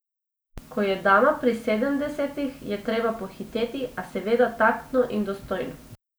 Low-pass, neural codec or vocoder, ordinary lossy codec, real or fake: none; none; none; real